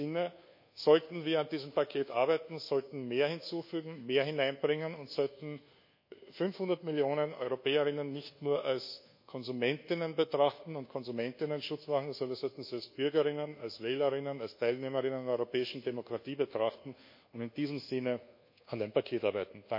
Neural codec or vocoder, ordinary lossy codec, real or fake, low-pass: codec, 24 kHz, 1.2 kbps, DualCodec; MP3, 32 kbps; fake; 5.4 kHz